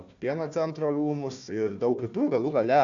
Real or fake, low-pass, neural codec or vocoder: fake; 7.2 kHz; codec, 16 kHz, 1 kbps, FunCodec, trained on Chinese and English, 50 frames a second